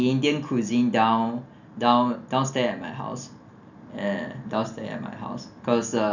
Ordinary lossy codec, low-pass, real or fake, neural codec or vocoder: none; 7.2 kHz; real; none